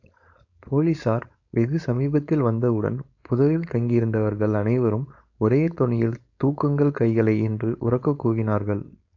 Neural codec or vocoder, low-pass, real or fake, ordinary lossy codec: codec, 16 kHz, 4.8 kbps, FACodec; 7.2 kHz; fake; AAC, 48 kbps